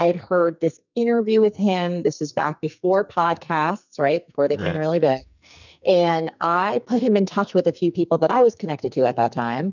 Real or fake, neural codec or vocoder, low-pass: fake; codec, 44.1 kHz, 2.6 kbps, SNAC; 7.2 kHz